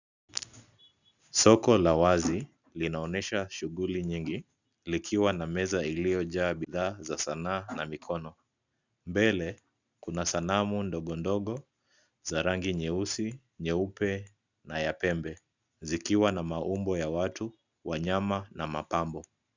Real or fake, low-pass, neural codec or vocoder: real; 7.2 kHz; none